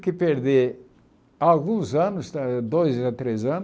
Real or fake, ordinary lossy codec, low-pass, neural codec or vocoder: real; none; none; none